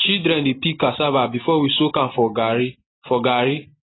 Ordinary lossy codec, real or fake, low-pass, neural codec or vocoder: AAC, 16 kbps; real; 7.2 kHz; none